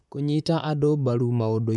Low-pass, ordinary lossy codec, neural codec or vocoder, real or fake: 10.8 kHz; none; vocoder, 44.1 kHz, 128 mel bands, Pupu-Vocoder; fake